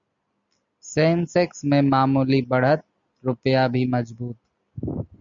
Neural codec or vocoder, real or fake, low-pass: none; real; 7.2 kHz